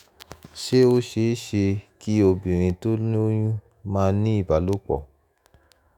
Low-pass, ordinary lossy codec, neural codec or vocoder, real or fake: 19.8 kHz; none; autoencoder, 48 kHz, 128 numbers a frame, DAC-VAE, trained on Japanese speech; fake